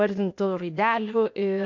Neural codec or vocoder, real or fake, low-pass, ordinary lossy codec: codec, 16 kHz, 0.8 kbps, ZipCodec; fake; 7.2 kHz; MP3, 48 kbps